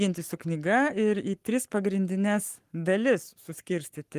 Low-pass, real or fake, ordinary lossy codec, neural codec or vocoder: 14.4 kHz; fake; Opus, 24 kbps; codec, 44.1 kHz, 7.8 kbps, Pupu-Codec